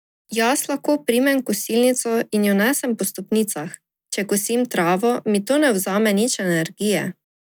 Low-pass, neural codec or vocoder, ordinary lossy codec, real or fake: none; none; none; real